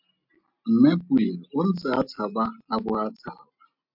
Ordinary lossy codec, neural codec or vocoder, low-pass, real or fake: MP3, 48 kbps; none; 5.4 kHz; real